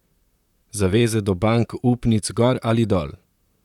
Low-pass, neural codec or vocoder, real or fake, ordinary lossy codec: 19.8 kHz; vocoder, 44.1 kHz, 128 mel bands, Pupu-Vocoder; fake; none